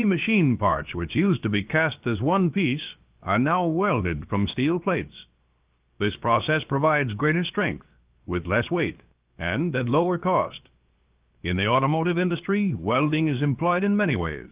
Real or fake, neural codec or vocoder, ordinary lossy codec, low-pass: fake; codec, 16 kHz, about 1 kbps, DyCAST, with the encoder's durations; Opus, 32 kbps; 3.6 kHz